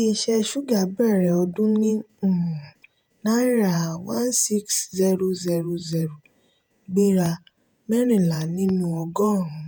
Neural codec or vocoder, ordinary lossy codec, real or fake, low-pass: vocoder, 48 kHz, 128 mel bands, Vocos; none; fake; none